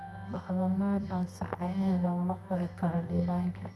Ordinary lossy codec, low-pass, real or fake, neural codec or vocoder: none; none; fake; codec, 24 kHz, 0.9 kbps, WavTokenizer, medium music audio release